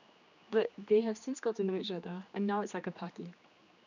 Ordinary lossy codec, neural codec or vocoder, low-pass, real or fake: none; codec, 16 kHz, 2 kbps, X-Codec, HuBERT features, trained on general audio; 7.2 kHz; fake